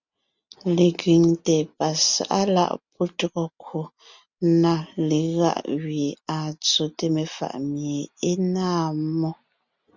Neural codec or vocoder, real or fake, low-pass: none; real; 7.2 kHz